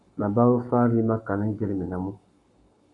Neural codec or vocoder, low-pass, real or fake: codec, 44.1 kHz, 7.8 kbps, Pupu-Codec; 10.8 kHz; fake